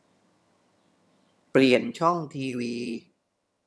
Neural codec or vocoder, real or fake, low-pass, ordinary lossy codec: vocoder, 22.05 kHz, 80 mel bands, HiFi-GAN; fake; none; none